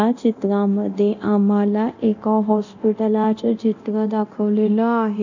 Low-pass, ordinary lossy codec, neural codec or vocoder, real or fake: 7.2 kHz; none; codec, 24 kHz, 0.9 kbps, DualCodec; fake